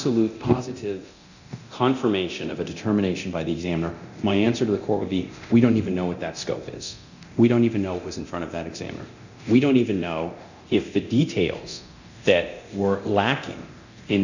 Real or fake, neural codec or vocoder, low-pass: fake; codec, 24 kHz, 0.9 kbps, DualCodec; 7.2 kHz